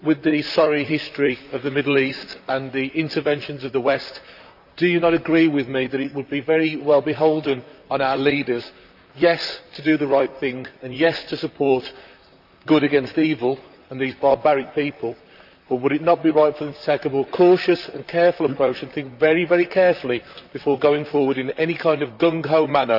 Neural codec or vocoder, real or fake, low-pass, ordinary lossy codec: vocoder, 44.1 kHz, 128 mel bands, Pupu-Vocoder; fake; 5.4 kHz; none